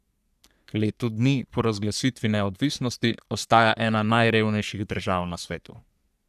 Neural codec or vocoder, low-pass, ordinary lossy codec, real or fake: codec, 44.1 kHz, 3.4 kbps, Pupu-Codec; 14.4 kHz; none; fake